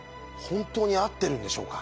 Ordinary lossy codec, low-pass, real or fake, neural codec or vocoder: none; none; real; none